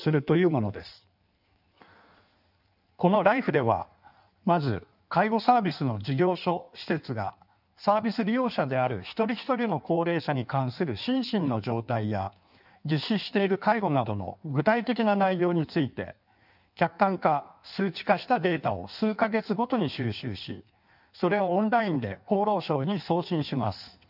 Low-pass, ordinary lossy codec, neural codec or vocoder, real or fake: 5.4 kHz; none; codec, 16 kHz in and 24 kHz out, 1.1 kbps, FireRedTTS-2 codec; fake